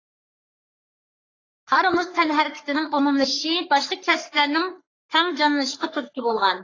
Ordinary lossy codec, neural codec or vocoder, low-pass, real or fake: AAC, 32 kbps; codec, 44.1 kHz, 3.4 kbps, Pupu-Codec; 7.2 kHz; fake